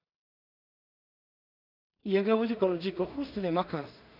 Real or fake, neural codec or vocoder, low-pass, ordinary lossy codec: fake; codec, 16 kHz in and 24 kHz out, 0.4 kbps, LongCat-Audio-Codec, two codebook decoder; 5.4 kHz; none